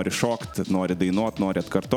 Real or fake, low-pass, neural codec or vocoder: real; 19.8 kHz; none